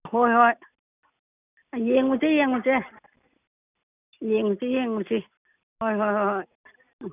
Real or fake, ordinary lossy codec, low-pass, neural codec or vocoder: real; none; 3.6 kHz; none